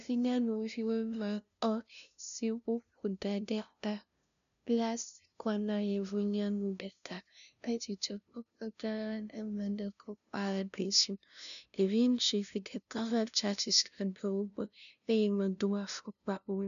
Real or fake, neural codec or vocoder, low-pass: fake; codec, 16 kHz, 0.5 kbps, FunCodec, trained on LibriTTS, 25 frames a second; 7.2 kHz